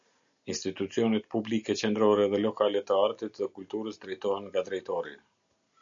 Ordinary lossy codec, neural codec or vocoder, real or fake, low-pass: MP3, 64 kbps; none; real; 7.2 kHz